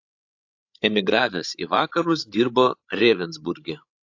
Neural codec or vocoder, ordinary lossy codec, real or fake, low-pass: codec, 16 kHz, 16 kbps, FreqCodec, larger model; AAC, 48 kbps; fake; 7.2 kHz